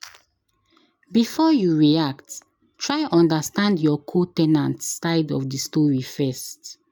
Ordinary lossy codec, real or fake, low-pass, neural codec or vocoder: none; real; none; none